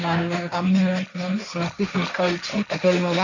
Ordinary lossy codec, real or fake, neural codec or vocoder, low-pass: none; fake; codec, 16 kHz, 1.1 kbps, Voila-Tokenizer; 7.2 kHz